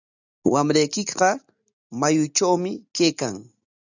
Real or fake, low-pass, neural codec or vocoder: real; 7.2 kHz; none